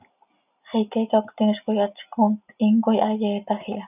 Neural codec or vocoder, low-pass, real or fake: none; 3.6 kHz; real